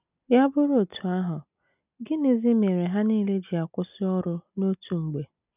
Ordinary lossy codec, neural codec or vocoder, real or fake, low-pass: none; none; real; 3.6 kHz